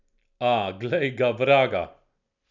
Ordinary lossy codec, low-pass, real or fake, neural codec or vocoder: none; 7.2 kHz; real; none